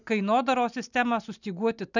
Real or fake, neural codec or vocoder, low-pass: real; none; 7.2 kHz